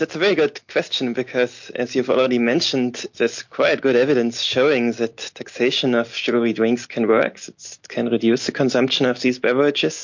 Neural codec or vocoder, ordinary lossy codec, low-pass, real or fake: none; MP3, 48 kbps; 7.2 kHz; real